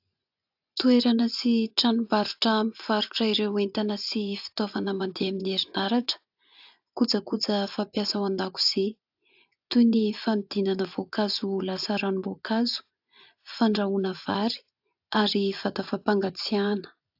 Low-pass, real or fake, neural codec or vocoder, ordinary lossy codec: 5.4 kHz; fake; vocoder, 24 kHz, 100 mel bands, Vocos; AAC, 48 kbps